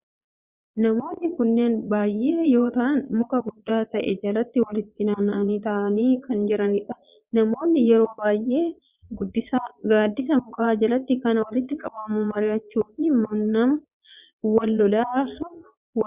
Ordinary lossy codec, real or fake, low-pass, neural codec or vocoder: Opus, 64 kbps; fake; 3.6 kHz; vocoder, 22.05 kHz, 80 mel bands, Vocos